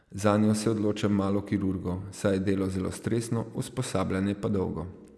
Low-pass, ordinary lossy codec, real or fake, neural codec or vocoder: none; none; real; none